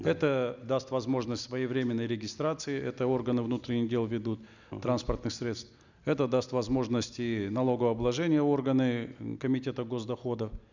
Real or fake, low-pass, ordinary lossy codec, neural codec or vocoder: real; 7.2 kHz; none; none